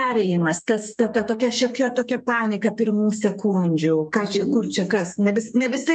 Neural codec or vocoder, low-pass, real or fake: codec, 32 kHz, 1.9 kbps, SNAC; 9.9 kHz; fake